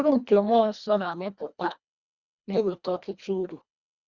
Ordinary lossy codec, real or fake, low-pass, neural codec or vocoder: Opus, 64 kbps; fake; 7.2 kHz; codec, 24 kHz, 1.5 kbps, HILCodec